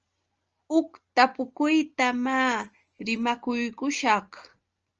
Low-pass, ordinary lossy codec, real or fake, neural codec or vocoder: 7.2 kHz; Opus, 24 kbps; real; none